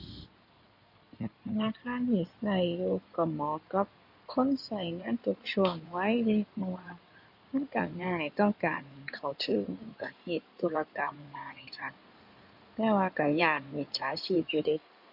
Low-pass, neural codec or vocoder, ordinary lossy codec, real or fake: 5.4 kHz; codec, 16 kHz in and 24 kHz out, 2.2 kbps, FireRedTTS-2 codec; none; fake